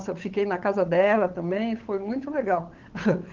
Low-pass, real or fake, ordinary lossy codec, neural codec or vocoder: 7.2 kHz; fake; Opus, 16 kbps; codec, 16 kHz, 8 kbps, FunCodec, trained on LibriTTS, 25 frames a second